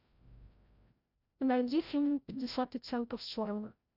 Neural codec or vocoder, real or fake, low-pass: codec, 16 kHz, 0.5 kbps, FreqCodec, larger model; fake; 5.4 kHz